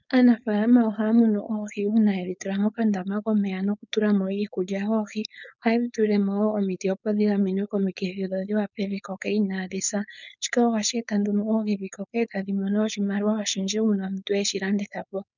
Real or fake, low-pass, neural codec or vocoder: fake; 7.2 kHz; codec, 16 kHz, 4.8 kbps, FACodec